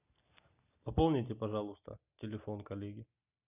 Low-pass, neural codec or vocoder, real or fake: 3.6 kHz; none; real